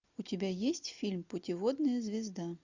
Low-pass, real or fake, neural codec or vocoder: 7.2 kHz; real; none